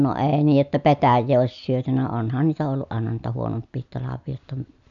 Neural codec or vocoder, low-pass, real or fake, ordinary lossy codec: none; 7.2 kHz; real; none